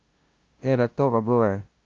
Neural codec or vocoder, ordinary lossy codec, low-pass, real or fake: codec, 16 kHz, 0.5 kbps, FunCodec, trained on LibriTTS, 25 frames a second; Opus, 24 kbps; 7.2 kHz; fake